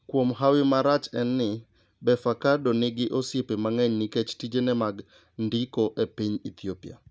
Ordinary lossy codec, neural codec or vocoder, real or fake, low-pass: none; none; real; none